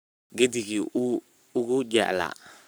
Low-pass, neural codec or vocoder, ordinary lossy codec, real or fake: none; codec, 44.1 kHz, 7.8 kbps, Pupu-Codec; none; fake